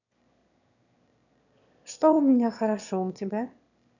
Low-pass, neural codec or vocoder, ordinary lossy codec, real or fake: 7.2 kHz; autoencoder, 22.05 kHz, a latent of 192 numbers a frame, VITS, trained on one speaker; none; fake